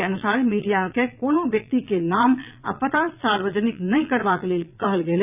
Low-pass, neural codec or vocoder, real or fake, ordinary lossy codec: 3.6 kHz; vocoder, 22.05 kHz, 80 mel bands, Vocos; fake; none